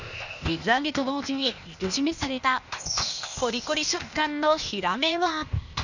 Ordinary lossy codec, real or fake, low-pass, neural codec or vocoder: none; fake; 7.2 kHz; codec, 16 kHz, 0.8 kbps, ZipCodec